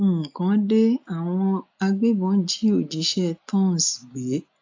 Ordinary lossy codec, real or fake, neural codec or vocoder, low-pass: AAC, 48 kbps; real; none; 7.2 kHz